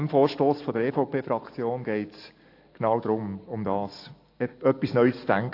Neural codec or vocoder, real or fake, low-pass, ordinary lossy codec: none; real; 5.4 kHz; AAC, 32 kbps